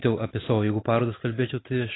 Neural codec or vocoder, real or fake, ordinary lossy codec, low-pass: none; real; AAC, 16 kbps; 7.2 kHz